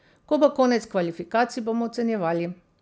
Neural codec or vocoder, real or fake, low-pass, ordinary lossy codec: none; real; none; none